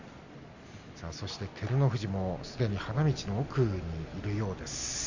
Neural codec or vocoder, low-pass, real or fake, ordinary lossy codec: none; 7.2 kHz; real; Opus, 64 kbps